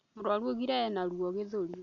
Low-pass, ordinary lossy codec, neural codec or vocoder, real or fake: 7.2 kHz; none; none; real